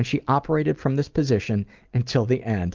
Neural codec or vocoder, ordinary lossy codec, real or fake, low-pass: none; Opus, 32 kbps; real; 7.2 kHz